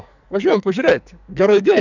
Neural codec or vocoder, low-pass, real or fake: codec, 44.1 kHz, 2.6 kbps, SNAC; 7.2 kHz; fake